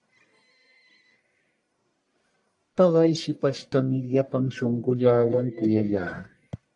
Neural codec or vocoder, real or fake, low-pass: codec, 44.1 kHz, 1.7 kbps, Pupu-Codec; fake; 10.8 kHz